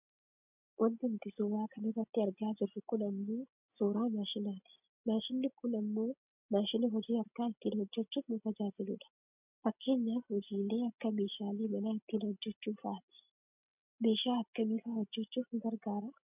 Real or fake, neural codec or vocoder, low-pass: real; none; 3.6 kHz